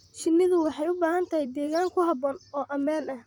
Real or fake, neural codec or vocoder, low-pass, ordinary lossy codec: fake; vocoder, 44.1 kHz, 128 mel bands, Pupu-Vocoder; 19.8 kHz; none